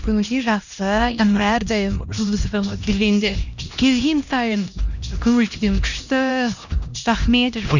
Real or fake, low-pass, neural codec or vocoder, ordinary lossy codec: fake; 7.2 kHz; codec, 16 kHz, 1 kbps, X-Codec, HuBERT features, trained on LibriSpeech; none